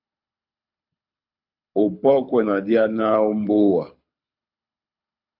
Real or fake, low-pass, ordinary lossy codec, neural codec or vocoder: fake; 5.4 kHz; MP3, 48 kbps; codec, 24 kHz, 6 kbps, HILCodec